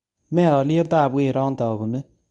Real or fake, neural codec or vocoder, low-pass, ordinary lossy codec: fake; codec, 24 kHz, 0.9 kbps, WavTokenizer, medium speech release version 1; 10.8 kHz; none